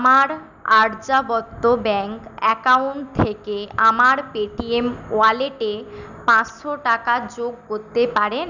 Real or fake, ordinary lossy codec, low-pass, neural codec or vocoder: real; none; 7.2 kHz; none